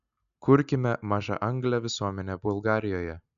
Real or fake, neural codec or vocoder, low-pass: real; none; 7.2 kHz